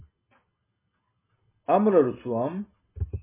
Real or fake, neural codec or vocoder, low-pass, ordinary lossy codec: real; none; 3.6 kHz; MP3, 16 kbps